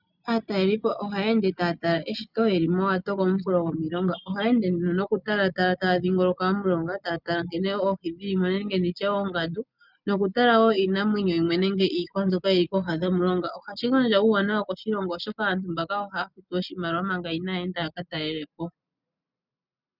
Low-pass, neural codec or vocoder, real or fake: 5.4 kHz; none; real